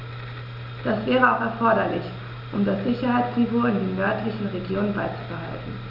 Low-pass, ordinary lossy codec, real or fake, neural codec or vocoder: 5.4 kHz; none; real; none